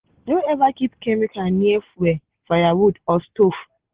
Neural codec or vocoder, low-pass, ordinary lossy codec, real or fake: none; 3.6 kHz; Opus, 16 kbps; real